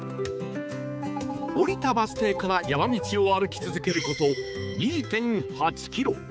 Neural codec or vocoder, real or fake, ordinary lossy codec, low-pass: codec, 16 kHz, 4 kbps, X-Codec, HuBERT features, trained on balanced general audio; fake; none; none